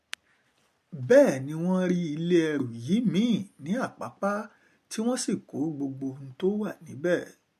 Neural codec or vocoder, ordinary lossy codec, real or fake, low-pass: none; MP3, 64 kbps; real; 14.4 kHz